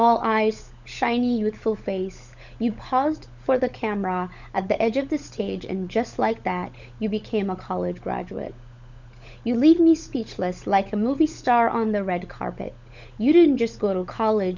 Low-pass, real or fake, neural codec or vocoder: 7.2 kHz; fake; codec, 16 kHz, 16 kbps, FunCodec, trained on LibriTTS, 50 frames a second